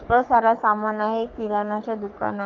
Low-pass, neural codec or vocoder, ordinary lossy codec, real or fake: 7.2 kHz; codec, 44.1 kHz, 3.4 kbps, Pupu-Codec; Opus, 24 kbps; fake